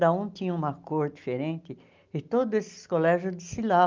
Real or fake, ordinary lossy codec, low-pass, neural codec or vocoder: real; Opus, 24 kbps; 7.2 kHz; none